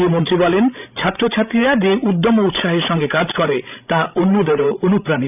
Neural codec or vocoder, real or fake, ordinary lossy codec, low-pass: codec, 16 kHz, 16 kbps, FreqCodec, larger model; fake; none; 3.6 kHz